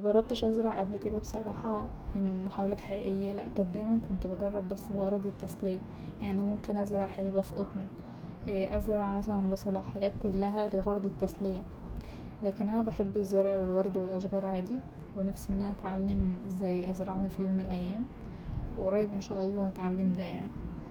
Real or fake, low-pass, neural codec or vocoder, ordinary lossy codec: fake; none; codec, 44.1 kHz, 2.6 kbps, DAC; none